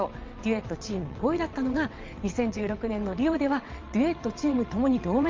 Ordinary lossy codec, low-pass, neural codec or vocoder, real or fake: Opus, 24 kbps; 7.2 kHz; vocoder, 22.05 kHz, 80 mel bands, WaveNeXt; fake